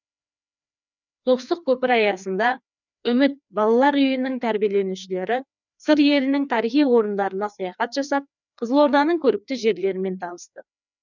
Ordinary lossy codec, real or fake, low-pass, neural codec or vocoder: none; fake; 7.2 kHz; codec, 16 kHz, 2 kbps, FreqCodec, larger model